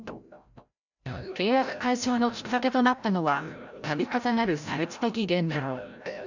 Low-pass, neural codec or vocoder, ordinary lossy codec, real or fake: 7.2 kHz; codec, 16 kHz, 0.5 kbps, FreqCodec, larger model; none; fake